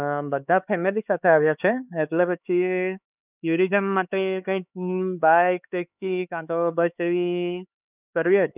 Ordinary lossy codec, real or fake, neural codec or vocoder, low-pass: none; fake; codec, 16 kHz, 4 kbps, X-Codec, HuBERT features, trained on LibriSpeech; 3.6 kHz